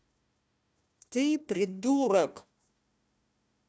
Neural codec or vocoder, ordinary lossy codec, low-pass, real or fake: codec, 16 kHz, 1 kbps, FunCodec, trained on Chinese and English, 50 frames a second; none; none; fake